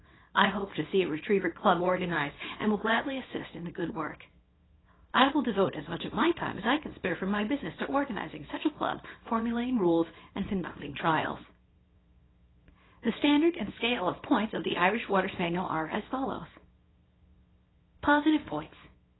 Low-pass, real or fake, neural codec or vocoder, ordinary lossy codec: 7.2 kHz; fake; codec, 24 kHz, 0.9 kbps, WavTokenizer, small release; AAC, 16 kbps